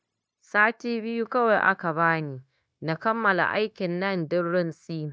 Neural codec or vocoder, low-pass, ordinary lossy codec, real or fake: codec, 16 kHz, 0.9 kbps, LongCat-Audio-Codec; none; none; fake